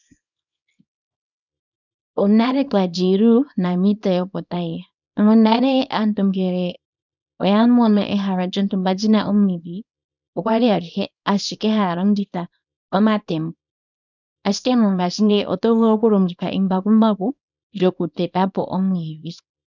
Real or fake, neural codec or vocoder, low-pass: fake; codec, 24 kHz, 0.9 kbps, WavTokenizer, small release; 7.2 kHz